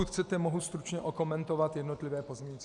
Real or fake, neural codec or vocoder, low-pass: real; none; 10.8 kHz